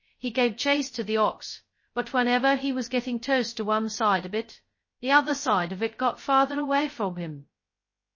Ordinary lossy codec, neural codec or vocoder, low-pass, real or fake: MP3, 32 kbps; codec, 16 kHz, 0.2 kbps, FocalCodec; 7.2 kHz; fake